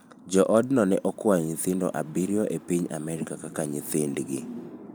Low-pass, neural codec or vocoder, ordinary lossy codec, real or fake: none; vocoder, 44.1 kHz, 128 mel bands every 512 samples, BigVGAN v2; none; fake